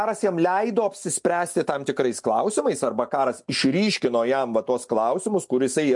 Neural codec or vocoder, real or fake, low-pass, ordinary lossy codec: none; real; 10.8 kHz; MP3, 64 kbps